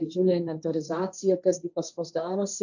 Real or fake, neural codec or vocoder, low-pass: fake; codec, 16 kHz, 1.1 kbps, Voila-Tokenizer; 7.2 kHz